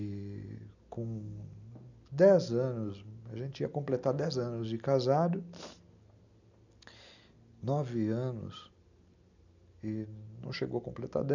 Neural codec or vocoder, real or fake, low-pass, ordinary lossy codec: none; real; 7.2 kHz; none